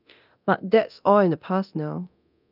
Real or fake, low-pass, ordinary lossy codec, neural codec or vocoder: fake; 5.4 kHz; none; codec, 24 kHz, 0.9 kbps, DualCodec